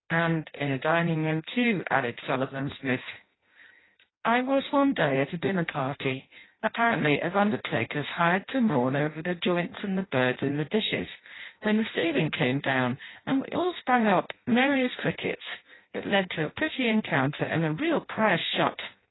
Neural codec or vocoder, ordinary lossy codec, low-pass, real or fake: codec, 16 kHz in and 24 kHz out, 0.6 kbps, FireRedTTS-2 codec; AAC, 16 kbps; 7.2 kHz; fake